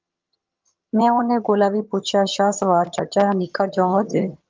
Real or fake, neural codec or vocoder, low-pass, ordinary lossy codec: fake; vocoder, 22.05 kHz, 80 mel bands, HiFi-GAN; 7.2 kHz; Opus, 32 kbps